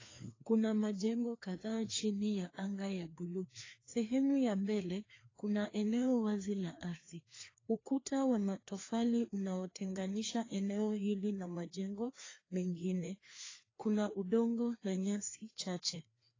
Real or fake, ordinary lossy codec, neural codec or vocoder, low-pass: fake; AAC, 32 kbps; codec, 16 kHz, 2 kbps, FreqCodec, larger model; 7.2 kHz